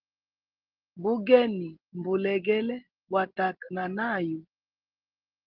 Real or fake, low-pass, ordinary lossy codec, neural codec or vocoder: real; 5.4 kHz; Opus, 16 kbps; none